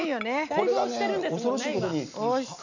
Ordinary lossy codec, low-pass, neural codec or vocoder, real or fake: none; 7.2 kHz; none; real